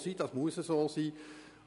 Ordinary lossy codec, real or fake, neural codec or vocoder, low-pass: none; real; none; 10.8 kHz